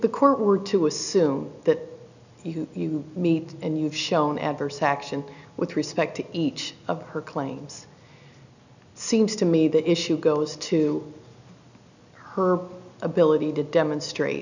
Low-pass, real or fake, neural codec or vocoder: 7.2 kHz; real; none